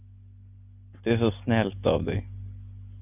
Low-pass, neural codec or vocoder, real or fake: 3.6 kHz; none; real